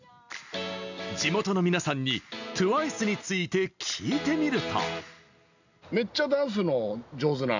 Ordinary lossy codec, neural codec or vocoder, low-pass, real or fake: none; none; 7.2 kHz; real